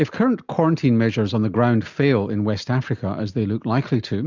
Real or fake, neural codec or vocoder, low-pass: real; none; 7.2 kHz